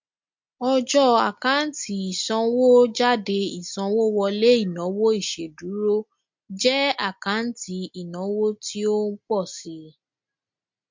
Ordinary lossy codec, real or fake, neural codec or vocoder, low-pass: MP3, 48 kbps; real; none; 7.2 kHz